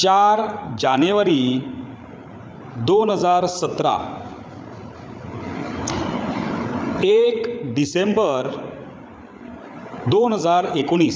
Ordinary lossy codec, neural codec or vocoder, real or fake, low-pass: none; codec, 16 kHz, 8 kbps, FreqCodec, larger model; fake; none